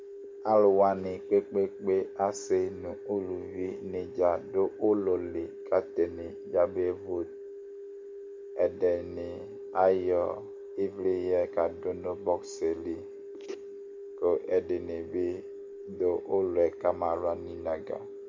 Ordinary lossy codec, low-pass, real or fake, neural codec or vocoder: MP3, 64 kbps; 7.2 kHz; real; none